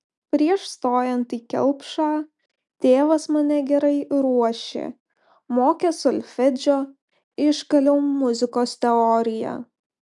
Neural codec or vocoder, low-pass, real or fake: none; 10.8 kHz; real